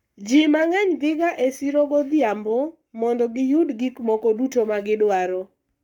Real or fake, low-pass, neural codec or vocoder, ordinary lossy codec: fake; 19.8 kHz; codec, 44.1 kHz, 7.8 kbps, Pupu-Codec; none